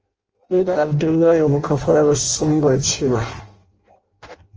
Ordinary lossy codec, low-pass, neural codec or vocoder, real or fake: Opus, 24 kbps; 7.2 kHz; codec, 16 kHz in and 24 kHz out, 0.6 kbps, FireRedTTS-2 codec; fake